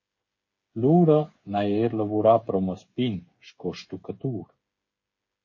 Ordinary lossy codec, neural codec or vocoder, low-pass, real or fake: MP3, 32 kbps; codec, 16 kHz, 8 kbps, FreqCodec, smaller model; 7.2 kHz; fake